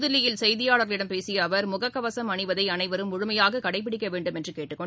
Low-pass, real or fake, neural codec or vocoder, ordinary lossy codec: none; real; none; none